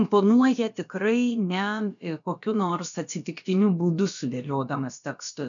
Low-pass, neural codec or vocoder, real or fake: 7.2 kHz; codec, 16 kHz, about 1 kbps, DyCAST, with the encoder's durations; fake